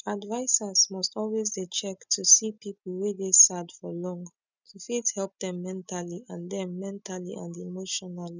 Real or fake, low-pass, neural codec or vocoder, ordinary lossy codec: real; 7.2 kHz; none; none